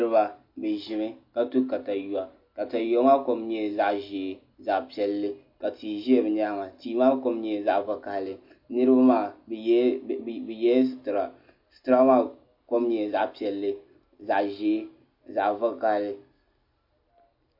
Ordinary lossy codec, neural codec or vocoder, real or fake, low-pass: MP3, 32 kbps; none; real; 5.4 kHz